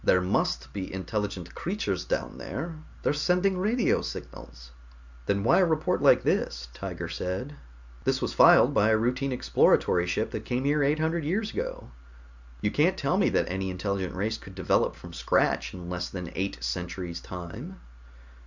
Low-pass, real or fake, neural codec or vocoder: 7.2 kHz; real; none